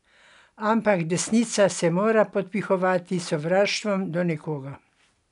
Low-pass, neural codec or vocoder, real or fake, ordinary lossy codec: 10.8 kHz; none; real; none